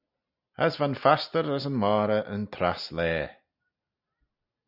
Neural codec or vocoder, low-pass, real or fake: none; 5.4 kHz; real